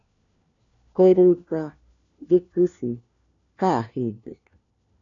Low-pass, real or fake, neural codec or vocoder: 7.2 kHz; fake; codec, 16 kHz, 1 kbps, FunCodec, trained on LibriTTS, 50 frames a second